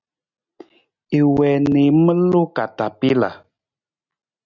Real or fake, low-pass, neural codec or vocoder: real; 7.2 kHz; none